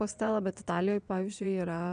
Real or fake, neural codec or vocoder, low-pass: fake; vocoder, 22.05 kHz, 80 mel bands, WaveNeXt; 9.9 kHz